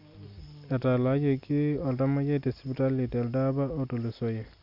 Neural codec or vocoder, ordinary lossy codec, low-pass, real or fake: none; MP3, 48 kbps; 5.4 kHz; real